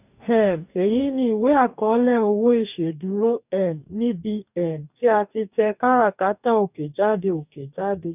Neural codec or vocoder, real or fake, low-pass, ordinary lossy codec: codec, 44.1 kHz, 2.6 kbps, DAC; fake; 3.6 kHz; none